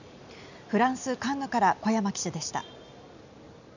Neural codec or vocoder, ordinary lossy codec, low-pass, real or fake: none; none; 7.2 kHz; real